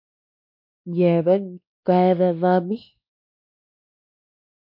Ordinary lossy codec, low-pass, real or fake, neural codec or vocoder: MP3, 32 kbps; 5.4 kHz; fake; codec, 16 kHz, 2 kbps, X-Codec, WavLM features, trained on Multilingual LibriSpeech